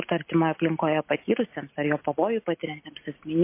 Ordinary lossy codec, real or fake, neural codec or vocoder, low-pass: MP3, 24 kbps; real; none; 3.6 kHz